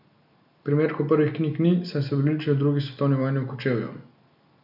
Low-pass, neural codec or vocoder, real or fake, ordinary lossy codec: 5.4 kHz; none; real; none